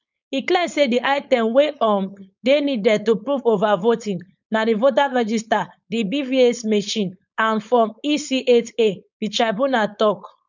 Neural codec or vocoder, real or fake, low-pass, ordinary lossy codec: codec, 16 kHz, 4.8 kbps, FACodec; fake; 7.2 kHz; none